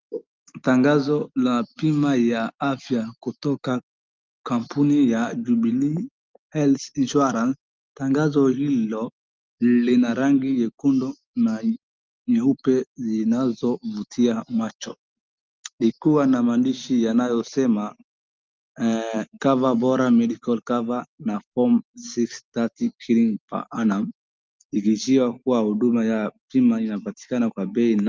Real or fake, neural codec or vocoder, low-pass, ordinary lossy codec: real; none; 7.2 kHz; Opus, 24 kbps